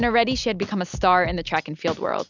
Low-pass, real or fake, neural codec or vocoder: 7.2 kHz; real; none